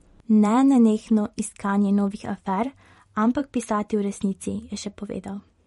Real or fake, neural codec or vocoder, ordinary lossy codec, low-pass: fake; vocoder, 44.1 kHz, 128 mel bands every 512 samples, BigVGAN v2; MP3, 48 kbps; 19.8 kHz